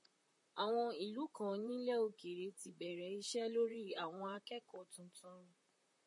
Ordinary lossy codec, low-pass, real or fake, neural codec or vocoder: MP3, 48 kbps; 10.8 kHz; fake; vocoder, 44.1 kHz, 128 mel bands, Pupu-Vocoder